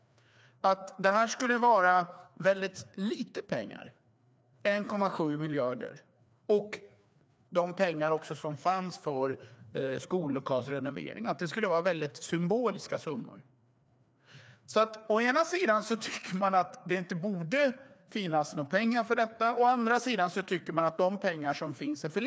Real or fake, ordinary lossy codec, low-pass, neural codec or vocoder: fake; none; none; codec, 16 kHz, 2 kbps, FreqCodec, larger model